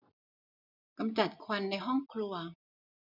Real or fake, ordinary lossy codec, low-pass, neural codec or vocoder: real; none; 5.4 kHz; none